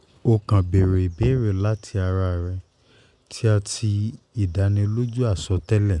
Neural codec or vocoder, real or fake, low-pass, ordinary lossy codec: none; real; 10.8 kHz; none